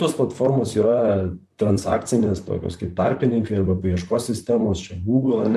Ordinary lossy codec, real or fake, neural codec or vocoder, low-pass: MP3, 96 kbps; fake; vocoder, 44.1 kHz, 128 mel bands, Pupu-Vocoder; 14.4 kHz